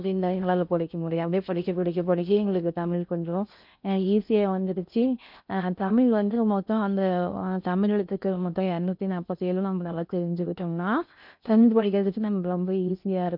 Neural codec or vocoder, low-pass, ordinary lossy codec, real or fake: codec, 16 kHz in and 24 kHz out, 0.6 kbps, FocalCodec, streaming, 4096 codes; 5.4 kHz; none; fake